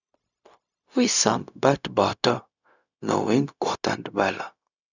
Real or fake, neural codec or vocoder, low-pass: fake; codec, 16 kHz, 0.4 kbps, LongCat-Audio-Codec; 7.2 kHz